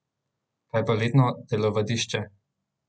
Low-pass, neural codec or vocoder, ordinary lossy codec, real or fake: none; none; none; real